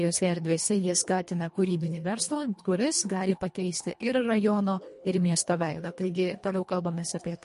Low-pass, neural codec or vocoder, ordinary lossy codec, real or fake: 10.8 kHz; codec, 24 kHz, 1.5 kbps, HILCodec; MP3, 48 kbps; fake